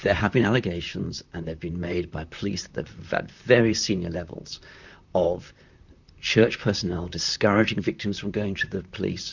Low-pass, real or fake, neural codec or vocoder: 7.2 kHz; fake; vocoder, 44.1 kHz, 128 mel bands, Pupu-Vocoder